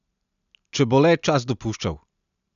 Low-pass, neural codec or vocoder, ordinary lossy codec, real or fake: 7.2 kHz; none; none; real